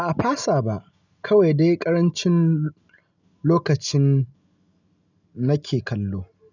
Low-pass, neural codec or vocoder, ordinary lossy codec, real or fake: 7.2 kHz; none; none; real